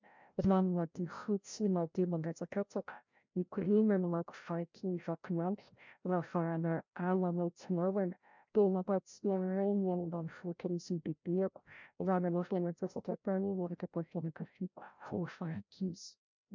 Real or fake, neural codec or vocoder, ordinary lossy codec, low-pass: fake; codec, 16 kHz, 0.5 kbps, FreqCodec, larger model; MP3, 64 kbps; 7.2 kHz